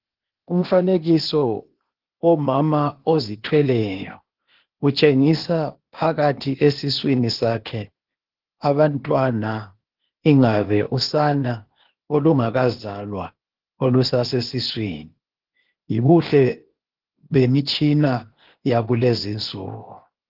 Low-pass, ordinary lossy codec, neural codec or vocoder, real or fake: 5.4 kHz; Opus, 16 kbps; codec, 16 kHz, 0.8 kbps, ZipCodec; fake